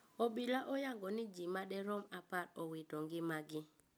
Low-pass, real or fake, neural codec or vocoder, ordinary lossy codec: none; real; none; none